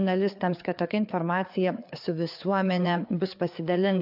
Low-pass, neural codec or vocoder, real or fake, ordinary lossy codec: 5.4 kHz; codec, 16 kHz, 4 kbps, FreqCodec, larger model; fake; AAC, 48 kbps